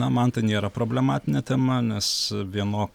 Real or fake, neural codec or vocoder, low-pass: real; none; 19.8 kHz